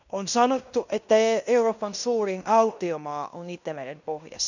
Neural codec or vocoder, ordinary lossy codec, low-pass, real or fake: codec, 16 kHz in and 24 kHz out, 0.9 kbps, LongCat-Audio-Codec, fine tuned four codebook decoder; none; 7.2 kHz; fake